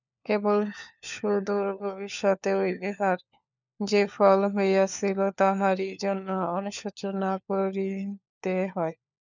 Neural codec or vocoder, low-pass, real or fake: codec, 16 kHz, 4 kbps, FunCodec, trained on LibriTTS, 50 frames a second; 7.2 kHz; fake